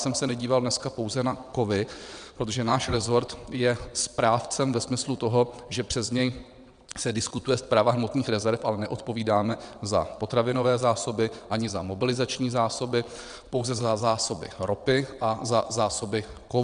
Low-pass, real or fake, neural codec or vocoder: 9.9 kHz; fake; vocoder, 22.05 kHz, 80 mel bands, WaveNeXt